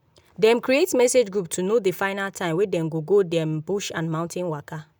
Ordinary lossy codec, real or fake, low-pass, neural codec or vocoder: none; real; none; none